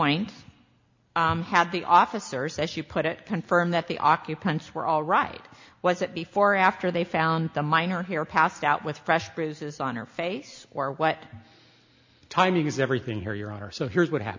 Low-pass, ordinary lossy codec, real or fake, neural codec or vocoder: 7.2 kHz; MP3, 64 kbps; real; none